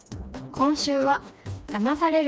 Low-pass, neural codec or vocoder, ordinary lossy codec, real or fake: none; codec, 16 kHz, 2 kbps, FreqCodec, smaller model; none; fake